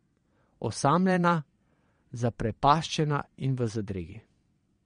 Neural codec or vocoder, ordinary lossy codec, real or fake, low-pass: vocoder, 22.05 kHz, 80 mel bands, Vocos; MP3, 48 kbps; fake; 9.9 kHz